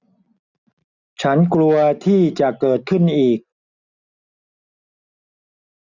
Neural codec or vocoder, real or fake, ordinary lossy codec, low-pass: none; real; none; 7.2 kHz